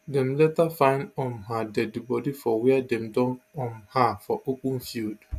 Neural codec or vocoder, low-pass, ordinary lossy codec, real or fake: none; 14.4 kHz; none; real